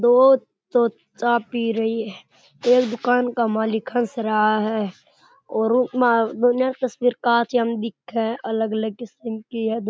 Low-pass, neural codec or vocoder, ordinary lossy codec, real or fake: none; none; none; real